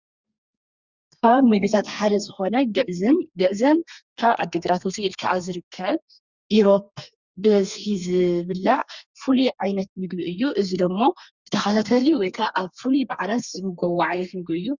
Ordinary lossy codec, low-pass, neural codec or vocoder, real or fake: Opus, 64 kbps; 7.2 kHz; codec, 32 kHz, 1.9 kbps, SNAC; fake